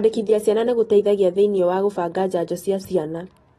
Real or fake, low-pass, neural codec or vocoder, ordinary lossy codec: real; 19.8 kHz; none; AAC, 32 kbps